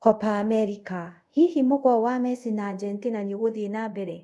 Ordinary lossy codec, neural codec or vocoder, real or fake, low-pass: none; codec, 24 kHz, 0.5 kbps, DualCodec; fake; none